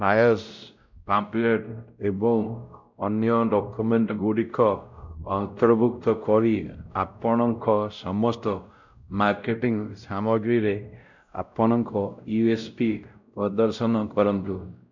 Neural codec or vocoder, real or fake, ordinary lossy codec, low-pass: codec, 16 kHz, 0.5 kbps, X-Codec, WavLM features, trained on Multilingual LibriSpeech; fake; none; 7.2 kHz